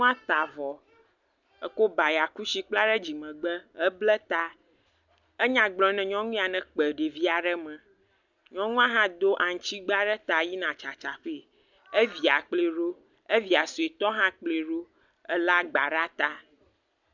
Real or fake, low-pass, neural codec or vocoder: real; 7.2 kHz; none